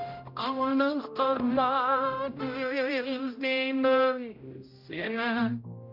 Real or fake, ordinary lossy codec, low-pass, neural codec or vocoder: fake; none; 5.4 kHz; codec, 16 kHz, 0.5 kbps, X-Codec, HuBERT features, trained on general audio